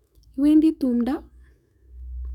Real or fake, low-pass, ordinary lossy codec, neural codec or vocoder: fake; 19.8 kHz; none; autoencoder, 48 kHz, 128 numbers a frame, DAC-VAE, trained on Japanese speech